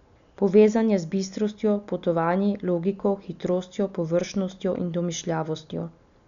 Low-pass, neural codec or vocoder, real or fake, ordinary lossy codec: 7.2 kHz; none; real; none